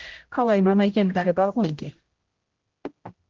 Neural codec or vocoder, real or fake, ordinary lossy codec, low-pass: codec, 16 kHz, 0.5 kbps, X-Codec, HuBERT features, trained on general audio; fake; Opus, 16 kbps; 7.2 kHz